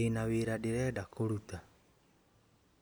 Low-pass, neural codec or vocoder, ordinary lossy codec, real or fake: none; none; none; real